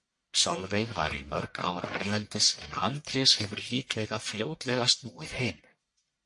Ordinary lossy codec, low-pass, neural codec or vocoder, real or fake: MP3, 48 kbps; 10.8 kHz; codec, 44.1 kHz, 1.7 kbps, Pupu-Codec; fake